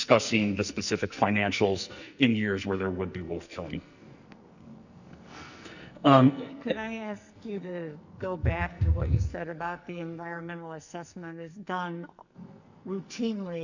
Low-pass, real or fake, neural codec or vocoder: 7.2 kHz; fake; codec, 44.1 kHz, 2.6 kbps, SNAC